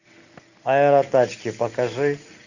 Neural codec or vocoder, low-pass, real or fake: none; 7.2 kHz; real